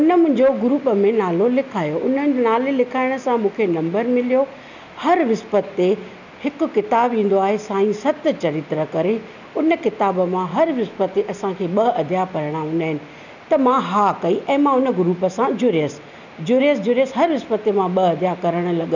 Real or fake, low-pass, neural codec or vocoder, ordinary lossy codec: real; 7.2 kHz; none; none